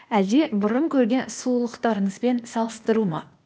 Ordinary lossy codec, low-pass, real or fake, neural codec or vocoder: none; none; fake; codec, 16 kHz, 0.8 kbps, ZipCodec